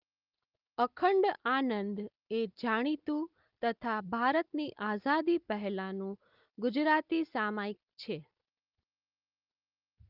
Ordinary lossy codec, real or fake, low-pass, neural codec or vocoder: Opus, 32 kbps; real; 5.4 kHz; none